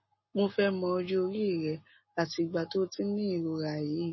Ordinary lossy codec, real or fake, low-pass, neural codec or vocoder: MP3, 24 kbps; real; 7.2 kHz; none